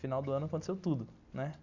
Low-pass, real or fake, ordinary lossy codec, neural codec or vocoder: 7.2 kHz; real; none; none